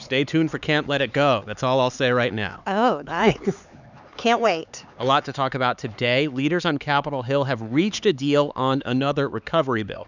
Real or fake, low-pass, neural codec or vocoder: fake; 7.2 kHz; codec, 16 kHz, 4 kbps, X-Codec, HuBERT features, trained on LibriSpeech